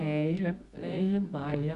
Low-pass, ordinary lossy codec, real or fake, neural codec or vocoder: 10.8 kHz; none; fake; codec, 24 kHz, 0.9 kbps, WavTokenizer, medium music audio release